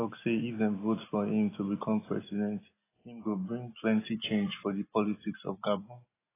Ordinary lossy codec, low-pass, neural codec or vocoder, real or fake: AAC, 16 kbps; 3.6 kHz; none; real